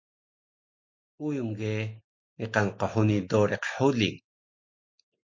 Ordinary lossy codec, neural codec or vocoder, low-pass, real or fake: MP3, 48 kbps; none; 7.2 kHz; real